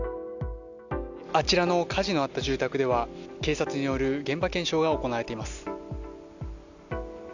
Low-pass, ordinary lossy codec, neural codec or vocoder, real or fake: 7.2 kHz; none; none; real